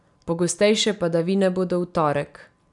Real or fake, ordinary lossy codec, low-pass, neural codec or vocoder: real; none; 10.8 kHz; none